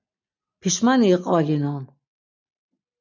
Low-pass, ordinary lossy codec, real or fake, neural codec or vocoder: 7.2 kHz; MP3, 64 kbps; real; none